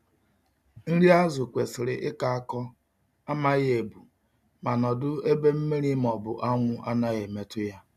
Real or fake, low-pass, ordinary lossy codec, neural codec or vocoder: real; 14.4 kHz; none; none